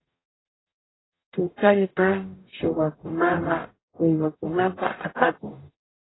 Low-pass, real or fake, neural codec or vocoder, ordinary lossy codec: 7.2 kHz; fake; codec, 44.1 kHz, 0.9 kbps, DAC; AAC, 16 kbps